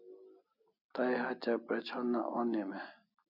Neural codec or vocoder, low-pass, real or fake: none; 5.4 kHz; real